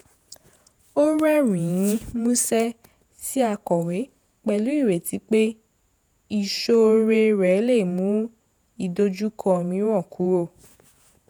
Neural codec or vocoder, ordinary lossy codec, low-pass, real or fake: vocoder, 48 kHz, 128 mel bands, Vocos; none; none; fake